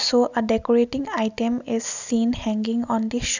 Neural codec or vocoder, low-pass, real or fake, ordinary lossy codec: none; 7.2 kHz; real; none